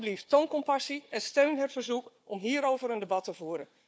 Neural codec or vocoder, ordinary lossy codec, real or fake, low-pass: codec, 16 kHz, 4 kbps, FunCodec, trained on Chinese and English, 50 frames a second; none; fake; none